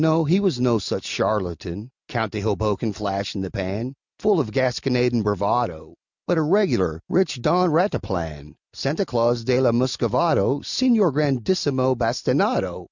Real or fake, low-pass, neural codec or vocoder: real; 7.2 kHz; none